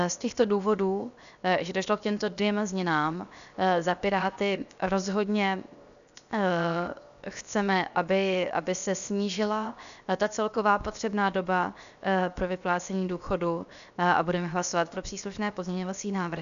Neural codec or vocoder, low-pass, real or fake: codec, 16 kHz, 0.7 kbps, FocalCodec; 7.2 kHz; fake